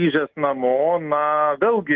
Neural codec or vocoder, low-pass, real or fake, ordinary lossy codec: none; 7.2 kHz; real; Opus, 32 kbps